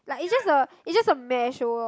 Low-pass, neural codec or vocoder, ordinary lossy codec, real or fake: none; none; none; real